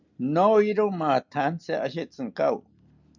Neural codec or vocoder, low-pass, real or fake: none; 7.2 kHz; real